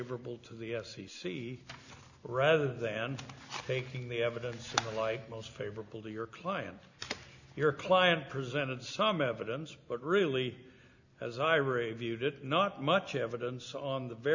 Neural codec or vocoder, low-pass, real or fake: none; 7.2 kHz; real